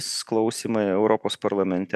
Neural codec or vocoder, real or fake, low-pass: none; real; 14.4 kHz